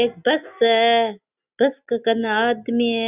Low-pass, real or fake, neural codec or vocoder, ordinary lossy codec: 3.6 kHz; real; none; Opus, 64 kbps